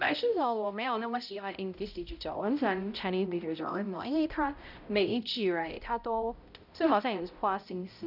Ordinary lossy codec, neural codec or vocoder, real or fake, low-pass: none; codec, 16 kHz, 0.5 kbps, X-Codec, HuBERT features, trained on balanced general audio; fake; 5.4 kHz